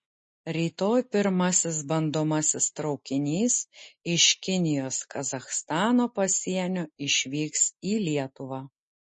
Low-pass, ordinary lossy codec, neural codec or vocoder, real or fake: 9.9 kHz; MP3, 32 kbps; none; real